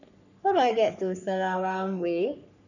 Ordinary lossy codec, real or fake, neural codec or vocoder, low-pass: none; fake; codec, 44.1 kHz, 3.4 kbps, Pupu-Codec; 7.2 kHz